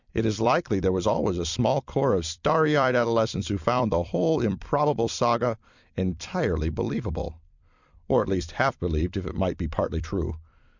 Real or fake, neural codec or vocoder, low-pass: fake; vocoder, 44.1 kHz, 128 mel bands every 256 samples, BigVGAN v2; 7.2 kHz